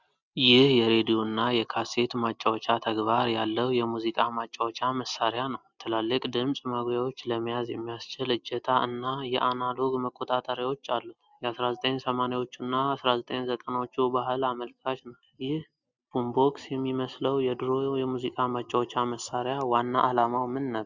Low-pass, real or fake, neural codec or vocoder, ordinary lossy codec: 7.2 kHz; real; none; Opus, 64 kbps